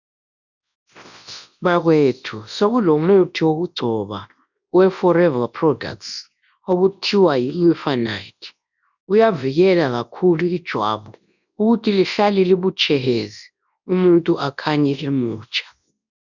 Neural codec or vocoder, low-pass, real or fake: codec, 24 kHz, 0.9 kbps, WavTokenizer, large speech release; 7.2 kHz; fake